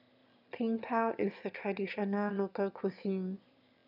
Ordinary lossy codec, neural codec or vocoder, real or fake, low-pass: none; autoencoder, 22.05 kHz, a latent of 192 numbers a frame, VITS, trained on one speaker; fake; 5.4 kHz